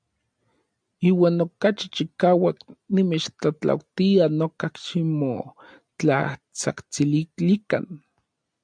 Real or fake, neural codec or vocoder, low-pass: real; none; 9.9 kHz